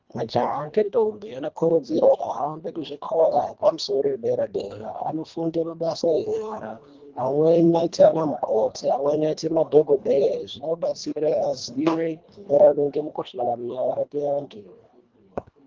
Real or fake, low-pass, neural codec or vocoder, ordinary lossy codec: fake; 7.2 kHz; codec, 24 kHz, 1.5 kbps, HILCodec; Opus, 32 kbps